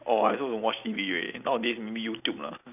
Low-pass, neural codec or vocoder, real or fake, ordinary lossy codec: 3.6 kHz; none; real; none